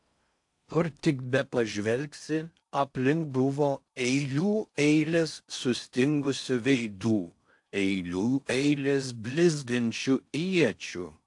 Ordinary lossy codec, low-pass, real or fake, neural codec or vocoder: AAC, 64 kbps; 10.8 kHz; fake; codec, 16 kHz in and 24 kHz out, 0.6 kbps, FocalCodec, streaming, 4096 codes